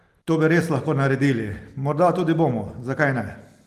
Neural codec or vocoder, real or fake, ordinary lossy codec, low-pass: none; real; Opus, 24 kbps; 14.4 kHz